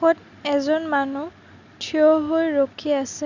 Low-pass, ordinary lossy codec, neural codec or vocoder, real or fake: 7.2 kHz; none; none; real